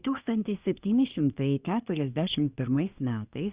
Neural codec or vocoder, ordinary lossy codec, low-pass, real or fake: codec, 24 kHz, 1 kbps, SNAC; Opus, 64 kbps; 3.6 kHz; fake